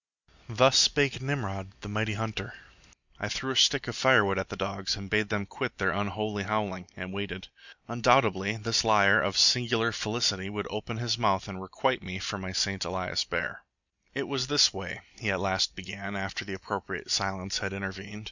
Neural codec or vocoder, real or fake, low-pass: none; real; 7.2 kHz